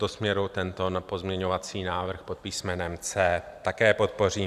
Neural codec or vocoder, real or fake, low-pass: none; real; 14.4 kHz